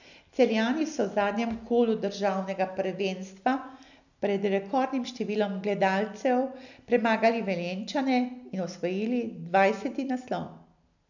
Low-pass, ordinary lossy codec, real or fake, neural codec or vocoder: 7.2 kHz; none; real; none